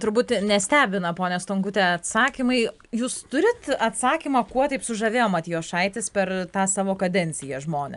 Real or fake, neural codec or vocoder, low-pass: real; none; 10.8 kHz